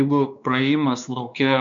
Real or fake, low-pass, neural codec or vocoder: fake; 7.2 kHz; codec, 16 kHz, 2 kbps, X-Codec, WavLM features, trained on Multilingual LibriSpeech